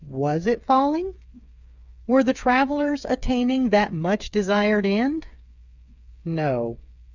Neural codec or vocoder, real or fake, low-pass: codec, 16 kHz, 8 kbps, FreqCodec, smaller model; fake; 7.2 kHz